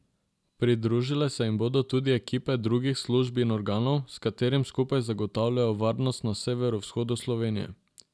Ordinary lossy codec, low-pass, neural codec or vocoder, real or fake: none; none; none; real